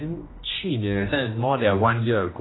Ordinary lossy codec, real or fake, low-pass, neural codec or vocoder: AAC, 16 kbps; fake; 7.2 kHz; codec, 16 kHz, 1 kbps, X-Codec, HuBERT features, trained on general audio